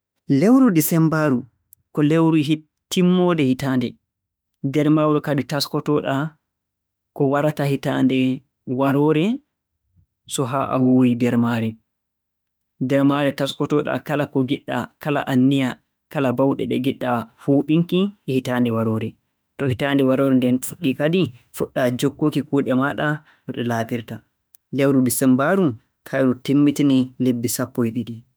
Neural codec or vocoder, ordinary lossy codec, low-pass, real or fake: autoencoder, 48 kHz, 32 numbers a frame, DAC-VAE, trained on Japanese speech; none; none; fake